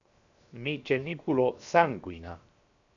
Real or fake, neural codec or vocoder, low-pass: fake; codec, 16 kHz, 0.7 kbps, FocalCodec; 7.2 kHz